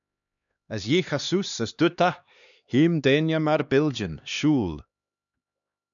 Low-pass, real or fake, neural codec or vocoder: 7.2 kHz; fake; codec, 16 kHz, 2 kbps, X-Codec, HuBERT features, trained on LibriSpeech